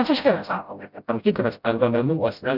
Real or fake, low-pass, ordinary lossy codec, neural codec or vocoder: fake; 5.4 kHz; Opus, 64 kbps; codec, 16 kHz, 0.5 kbps, FreqCodec, smaller model